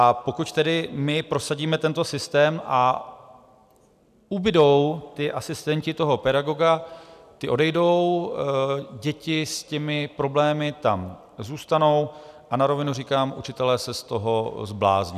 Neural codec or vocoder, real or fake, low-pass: none; real; 14.4 kHz